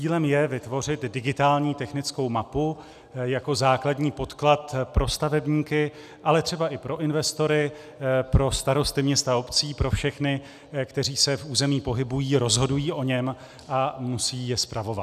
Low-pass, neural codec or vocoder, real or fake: 14.4 kHz; none; real